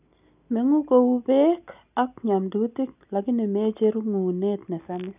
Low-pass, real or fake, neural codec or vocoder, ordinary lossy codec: 3.6 kHz; real; none; none